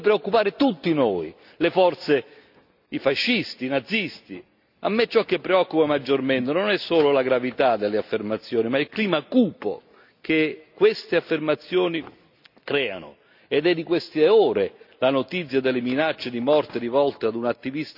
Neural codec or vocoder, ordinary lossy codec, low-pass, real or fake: none; none; 5.4 kHz; real